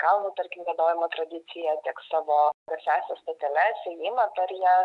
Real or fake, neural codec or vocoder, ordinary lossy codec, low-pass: fake; autoencoder, 48 kHz, 128 numbers a frame, DAC-VAE, trained on Japanese speech; MP3, 96 kbps; 10.8 kHz